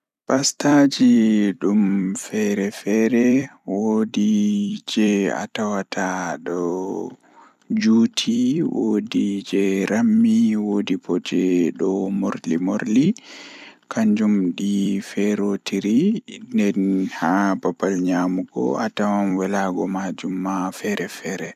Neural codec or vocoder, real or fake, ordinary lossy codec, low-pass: vocoder, 44.1 kHz, 128 mel bands every 256 samples, BigVGAN v2; fake; none; 14.4 kHz